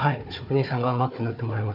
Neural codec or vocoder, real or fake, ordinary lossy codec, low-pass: codec, 16 kHz, 4 kbps, FunCodec, trained on Chinese and English, 50 frames a second; fake; none; 5.4 kHz